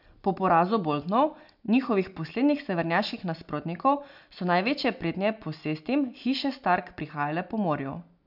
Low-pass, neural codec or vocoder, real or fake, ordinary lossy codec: 5.4 kHz; none; real; none